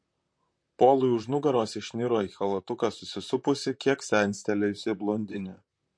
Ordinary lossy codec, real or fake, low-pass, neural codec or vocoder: MP3, 48 kbps; fake; 9.9 kHz; vocoder, 44.1 kHz, 128 mel bands, Pupu-Vocoder